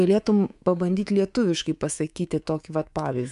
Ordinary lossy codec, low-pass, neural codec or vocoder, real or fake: MP3, 96 kbps; 10.8 kHz; codec, 24 kHz, 3.1 kbps, DualCodec; fake